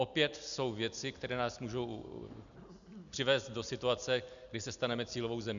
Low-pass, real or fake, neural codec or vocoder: 7.2 kHz; real; none